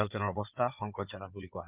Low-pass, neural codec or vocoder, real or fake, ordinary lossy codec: 3.6 kHz; codec, 16 kHz in and 24 kHz out, 2.2 kbps, FireRedTTS-2 codec; fake; Opus, 24 kbps